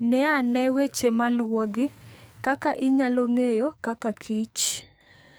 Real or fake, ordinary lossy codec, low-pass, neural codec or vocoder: fake; none; none; codec, 44.1 kHz, 2.6 kbps, SNAC